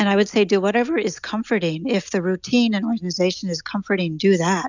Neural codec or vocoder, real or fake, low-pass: none; real; 7.2 kHz